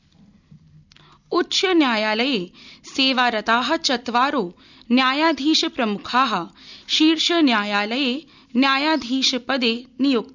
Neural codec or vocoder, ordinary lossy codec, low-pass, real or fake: none; none; 7.2 kHz; real